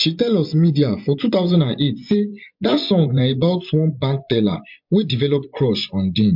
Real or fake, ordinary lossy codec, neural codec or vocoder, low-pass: fake; MP3, 48 kbps; vocoder, 24 kHz, 100 mel bands, Vocos; 5.4 kHz